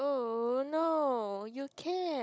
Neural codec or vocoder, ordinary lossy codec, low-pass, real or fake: codec, 16 kHz, 16 kbps, FunCodec, trained on Chinese and English, 50 frames a second; none; none; fake